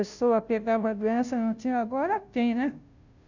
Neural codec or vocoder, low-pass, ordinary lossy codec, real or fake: codec, 16 kHz, 0.5 kbps, FunCodec, trained on Chinese and English, 25 frames a second; 7.2 kHz; none; fake